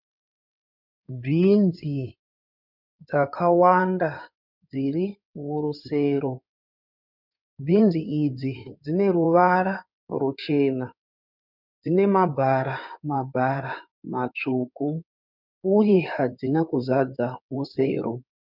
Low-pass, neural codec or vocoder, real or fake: 5.4 kHz; codec, 16 kHz in and 24 kHz out, 2.2 kbps, FireRedTTS-2 codec; fake